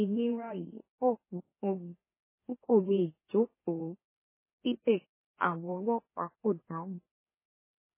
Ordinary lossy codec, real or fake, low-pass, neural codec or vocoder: MP3, 16 kbps; fake; 3.6 kHz; autoencoder, 44.1 kHz, a latent of 192 numbers a frame, MeloTTS